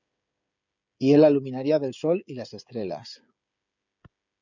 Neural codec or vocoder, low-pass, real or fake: codec, 16 kHz, 16 kbps, FreqCodec, smaller model; 7.2 kHz; fake